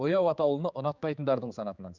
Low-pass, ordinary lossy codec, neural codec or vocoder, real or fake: 7.2 kHz; none; codec, 16 kHz, 4 kbps, X-Codec, HuBERT features, trained on general audio; fake